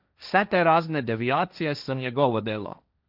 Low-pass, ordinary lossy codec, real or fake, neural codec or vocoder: 5.4 kHz; none; fake; codec, 16 kHz, 1.1 kbps, Voila-Tokenizer